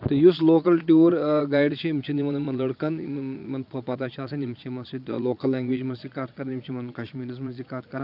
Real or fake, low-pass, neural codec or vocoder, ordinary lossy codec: fake; 5.4 kHz; vocoder, 22.05 kHz, 80 mel bands, Vocos; none